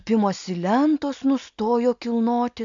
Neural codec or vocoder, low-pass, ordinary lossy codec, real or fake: none; 7.2 kHz; MP3, 64 kbps; real